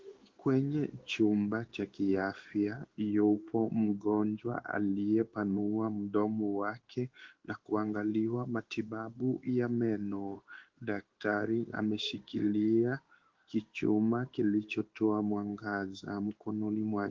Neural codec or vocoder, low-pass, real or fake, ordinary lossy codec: codec, 16 kHz in and 24 kHz out, 1 kbps, XY-Tokenizer; 7.2 kHz; fake; Opus, 16 kbps